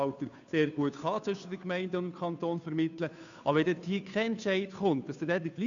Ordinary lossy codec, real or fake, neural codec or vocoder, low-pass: none; fake; codec, 16 kHz, 2 kbps, FunCodec, trained on Chinese and English, 25 frames a second; 7.2 kHz